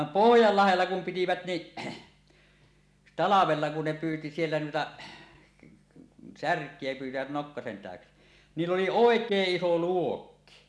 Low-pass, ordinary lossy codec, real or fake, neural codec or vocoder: none; none; real; none